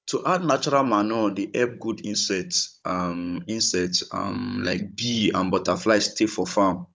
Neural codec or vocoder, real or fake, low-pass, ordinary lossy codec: codec, 16 kHz, 16 kbps, FunCodec, trained on Chinese and English, 50 frames a second; fake; none; none